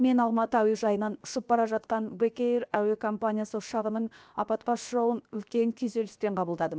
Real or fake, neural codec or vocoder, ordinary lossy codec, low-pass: fake; codec, 16 kHz, 0.7 kbps, FocalCodec; none; none